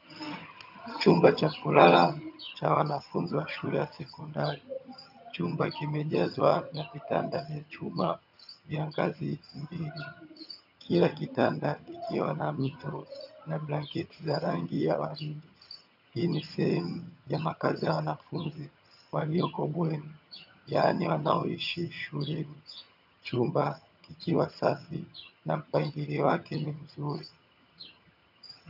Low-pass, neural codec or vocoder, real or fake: 5.4 kHz; vocoder, 22.05 kHz, 80 mel bands, HiFi-GAN; fake